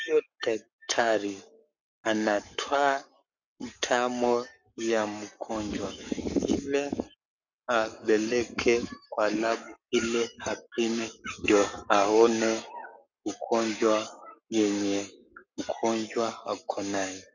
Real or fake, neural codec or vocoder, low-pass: fake; codec, 44.1 kHz, 7.8 kbps, Pupu-Codec; 7.2 kHz